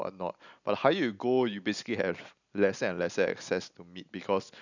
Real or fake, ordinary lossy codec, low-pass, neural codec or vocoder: real; none; 7.2 kHz; none